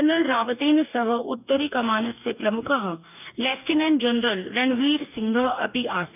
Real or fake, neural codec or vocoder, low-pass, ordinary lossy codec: fake; codec, 44.1 kHz, 2.6 kbps, DAC; 3.6 kHz; none